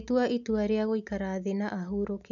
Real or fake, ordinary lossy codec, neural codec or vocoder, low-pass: real; none; none; 7.2 kHz